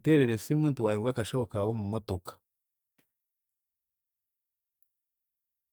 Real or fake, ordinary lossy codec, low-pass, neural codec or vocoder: fake; none; none; codec, 44.1 kHz, 2.6 kbps, SNAC